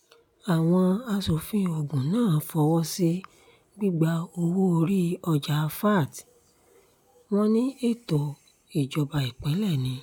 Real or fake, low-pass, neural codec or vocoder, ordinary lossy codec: real; 19.8 kHz; none; none